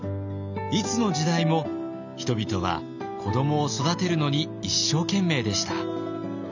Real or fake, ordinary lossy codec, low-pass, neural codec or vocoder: real; none; 7.2 kHz; none